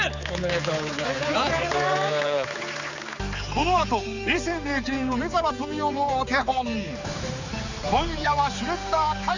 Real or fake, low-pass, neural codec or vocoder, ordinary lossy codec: fake; 7.2 kHz; codec, 16 kHz, 4 kbps, X-Codec, HuBERT features, trained on general audio; Opus, 64 kbps